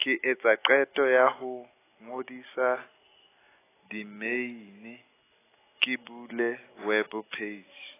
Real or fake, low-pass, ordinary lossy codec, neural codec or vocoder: real; 3.6 kHz; AAC, 16 kbps; none